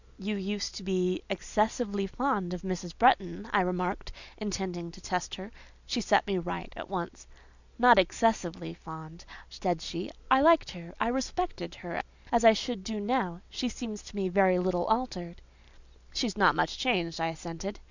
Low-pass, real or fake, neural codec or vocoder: 7.2 kHz; real; none